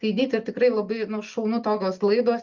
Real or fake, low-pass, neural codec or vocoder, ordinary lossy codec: real; 7.2 kHz; none; Opus, 24 kbps